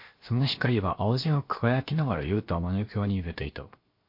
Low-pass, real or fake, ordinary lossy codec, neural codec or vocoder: 5.4 kHz; fake; MP3, 32 kbps; codec, 16 kHz, 0.7 kbps, FocalCodec